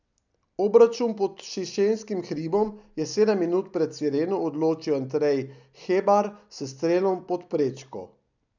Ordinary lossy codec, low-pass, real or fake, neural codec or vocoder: none; 7.2 kHz; real; none